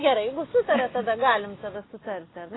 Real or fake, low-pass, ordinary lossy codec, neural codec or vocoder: real; 7.2 kHz; AAC, 16 kbps; none